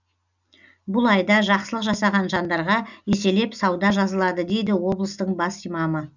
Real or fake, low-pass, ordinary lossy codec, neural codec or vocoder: real; 7.2 kHz; none; none